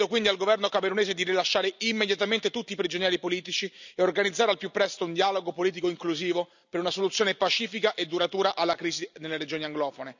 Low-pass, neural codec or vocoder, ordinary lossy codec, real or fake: 7.2 kHz; none; none; real